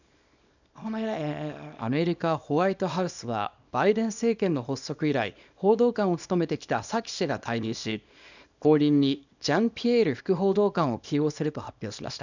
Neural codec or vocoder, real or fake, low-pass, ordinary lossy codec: codec, 24 kHz, 0.9 kbps, WavTokenizer, small release; fake; 7.2 kHz; none